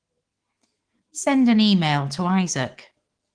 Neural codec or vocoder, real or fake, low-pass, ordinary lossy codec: autoencoder, 48 kHz, 128 numbers a frame, DAC-VAE, trained on Japanese speech; fake; 9.9 kHz; Opus, 16 kbps